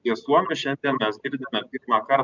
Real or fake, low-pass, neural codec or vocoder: real; 7.2 kHz; none